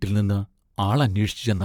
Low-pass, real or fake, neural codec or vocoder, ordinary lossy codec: 19.8 kHz; fake; vocoder, 44.1 kHz, 128 mel bands, Pupu-Vocoder; none